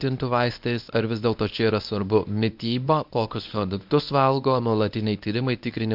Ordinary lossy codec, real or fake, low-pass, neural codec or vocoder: MP3, 48 kbps; fake; 5.4 kHz; codec, 24 kHz, 0.9 kbps, WavTokenizer, medium speech release version 1